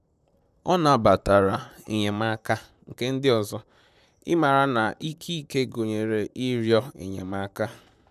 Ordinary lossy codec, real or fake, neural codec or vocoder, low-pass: none; fake; vocoder, 44.1 kHz, 128 mel bands, Pupu-Vocoder; 14.4 kHz